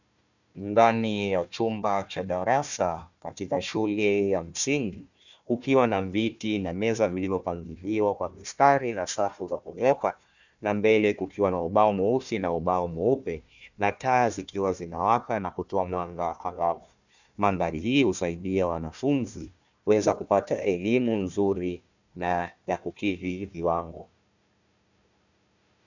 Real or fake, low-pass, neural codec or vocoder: fake; 7.2 kHz; codec, 16 kHz, 1 kbps, FunCodec, trained on Chinese and English, 50 frames a second